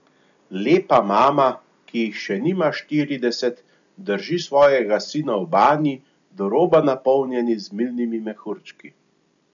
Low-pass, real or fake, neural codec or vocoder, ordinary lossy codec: 7.2 kHz; real; none; none